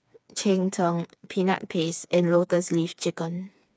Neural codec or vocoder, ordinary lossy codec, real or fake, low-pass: codec, 16 kHz, 4 kbps, FreqCodec, smaller model; none; fake; none